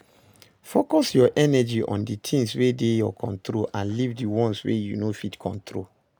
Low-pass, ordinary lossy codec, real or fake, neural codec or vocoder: none; none; real; none